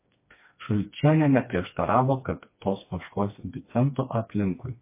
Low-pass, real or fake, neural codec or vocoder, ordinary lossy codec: 3.6 kHz; fake; codec, 16 kHz, 2 kbps, FreqCodec, smaller model; MP3, 24 kbps